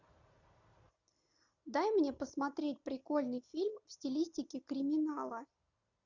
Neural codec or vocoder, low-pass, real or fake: none; 7.2 kHz; real